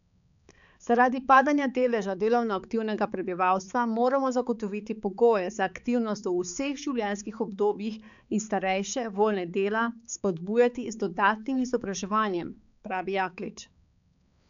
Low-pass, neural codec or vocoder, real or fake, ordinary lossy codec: 7.2 kHz; codec, 16 kHz, 4 kbps, X-Codec, HuBERT features, trained on balanced general audio; fake; none